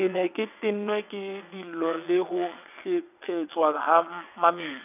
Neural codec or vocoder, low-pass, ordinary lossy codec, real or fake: vocoder, 22.05 kHz, 80 mel bands, WaveNeXt; 3.6 kHz; none; fake